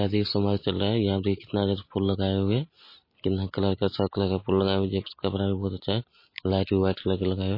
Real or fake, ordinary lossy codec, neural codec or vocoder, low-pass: real; MP3, 24 kbps; none; 5.4 kHz